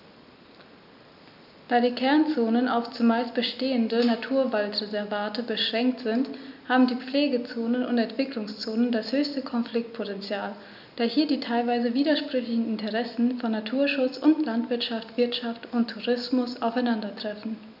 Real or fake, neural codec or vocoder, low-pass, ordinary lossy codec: real; none; 5.4 kHz; none